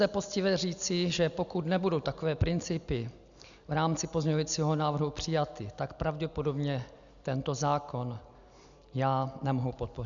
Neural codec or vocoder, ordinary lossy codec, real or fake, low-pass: none; MP3, 96 kbps; real; 7.2 kHz